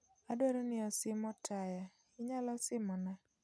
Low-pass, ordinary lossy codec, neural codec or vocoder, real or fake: none; none; none; real